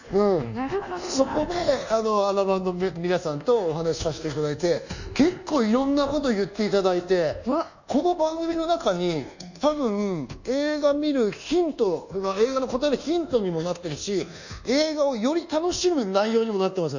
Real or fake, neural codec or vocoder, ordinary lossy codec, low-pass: fake; codec, 24 kHz, 1.2 kbps, DualCodec; none; 7.2 kHz